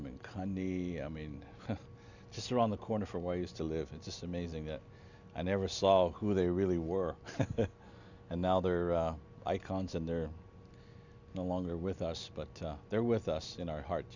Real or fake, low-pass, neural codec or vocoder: real; 7.2 kHz; none